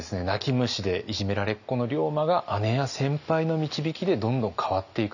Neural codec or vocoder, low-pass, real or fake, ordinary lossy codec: none; 7.2 kHz; real; none